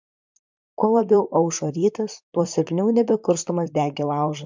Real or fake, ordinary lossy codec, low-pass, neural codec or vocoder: fake; MP3, 64 kbps; 7.2 kHz; vocoder, 44.1 kHz, 128 mel bands, Pupu-Vocoder